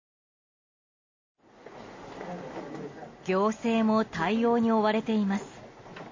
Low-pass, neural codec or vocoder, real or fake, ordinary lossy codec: 7.2 kHz; none; real; MP3, 32 kbps